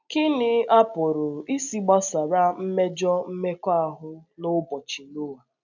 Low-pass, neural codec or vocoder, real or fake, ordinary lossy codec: 7.2 kHz; none; real; none